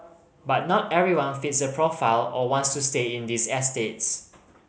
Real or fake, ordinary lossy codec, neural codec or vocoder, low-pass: real; none; none; none